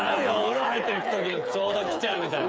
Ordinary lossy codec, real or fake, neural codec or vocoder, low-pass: none; fake; codec, 16 kHz, 8 kbps, FreqCodec, smaller model; none